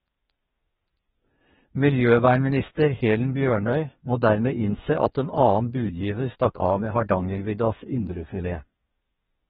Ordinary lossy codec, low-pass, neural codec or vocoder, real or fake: AAC, 16 kbps; 14.4 kHz; codec, 32 kHz, 1.9 kbps, SNAC; fake